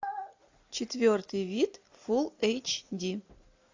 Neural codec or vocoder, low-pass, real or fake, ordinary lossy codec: none; 7.2 kHz; real; AAC, 48 kbps